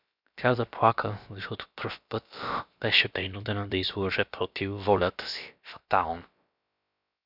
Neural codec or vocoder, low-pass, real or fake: codec, 16 kHz, about 1 kbps, DyCAST, with the encoder's durations; 5.4 kHz; fake